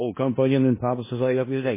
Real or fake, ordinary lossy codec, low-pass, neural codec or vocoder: fake; MP3, 16 kbps; 3.6 kHz; codec, 16 kHz in and 24 kHz out, 0.4 kbps, LongCat-Audio-Codec, four codebook decoder